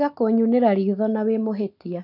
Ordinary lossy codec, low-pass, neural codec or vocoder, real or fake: none; 5.4 kHz; none; real